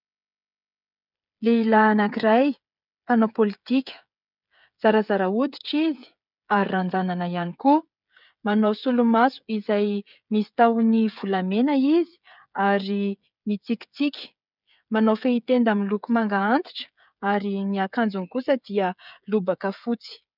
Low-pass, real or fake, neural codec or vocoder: 5.4 kHz; fake; codec, 16 kHz, 8 kbps, FreqCodec, smaller model